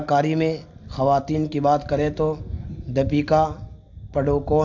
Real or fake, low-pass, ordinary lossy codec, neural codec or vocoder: real; 7.2 kHz; AAC, 48 kbps; none